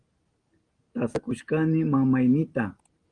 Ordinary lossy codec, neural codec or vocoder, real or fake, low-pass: Opus, 24 kbps; none; real; 9.9 kHz